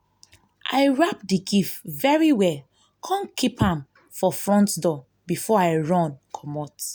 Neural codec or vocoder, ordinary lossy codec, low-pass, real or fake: none; none; none; real